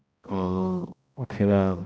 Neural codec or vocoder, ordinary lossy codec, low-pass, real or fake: codec, 16 kHz, 0.5 kbps, X-Codec, HuBERT features, trained on balanced general audio; none; none; fake